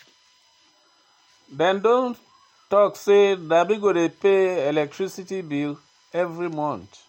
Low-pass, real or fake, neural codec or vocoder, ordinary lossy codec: 19.8 kHz; real; none; MP3, 64 kbps